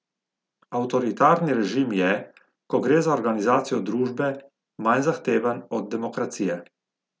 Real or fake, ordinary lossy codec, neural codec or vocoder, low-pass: real; none; none; none